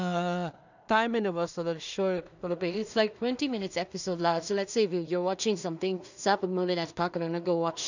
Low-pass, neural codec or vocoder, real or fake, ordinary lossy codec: 7.2 kHz; codec, 16 kHz in and 24 kHz out, 0.4 kbps, LongCat-Audio-Codec, two codebook decoder; fake; none